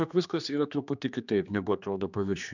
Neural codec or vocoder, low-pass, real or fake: codec, 16 kHz, 2 kbps, X-Codec, HuBERT features, trained on general audio; 7.2 kHz; fake